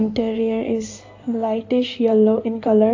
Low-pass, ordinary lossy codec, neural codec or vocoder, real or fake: 7.2 kHz; AAC, 48 kbps; codec, 16 kHz in and 24 kHz out, 2.2 kbps, FireRedTTS-2 codec; fake